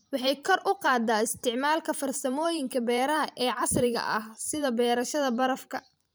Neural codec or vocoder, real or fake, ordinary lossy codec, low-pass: vocoder, 44.1 kHz, 128 mel bands every 512 samples, BigVGAN v2; fake; none; none